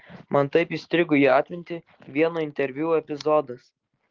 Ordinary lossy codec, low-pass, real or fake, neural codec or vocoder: Opus, 16 kbps; 7.2 kHz; real; none